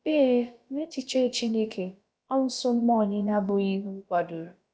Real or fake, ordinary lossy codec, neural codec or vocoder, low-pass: fake; none; codec, 16 kHz, about 1 kbps, DyCAST, with the encoder's durations; none